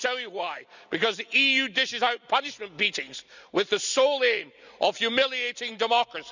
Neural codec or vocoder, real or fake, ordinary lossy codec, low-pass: none; real; none; 7.2 kHz